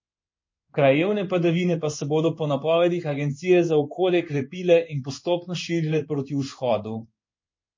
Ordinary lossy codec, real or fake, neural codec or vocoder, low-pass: MP3, 32 kbps; fake; codec, 16 kHz in and 24 kHz out, 1 kbps, XY-Tokenizer; 7.2 kHz